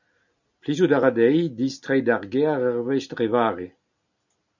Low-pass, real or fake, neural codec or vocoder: 7.2 kHz; real; none